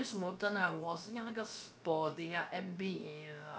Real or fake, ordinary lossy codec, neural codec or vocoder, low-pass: fake; none; codec, 16 kHz, about 1 kbps, DyCAST, with the encoder's durations; none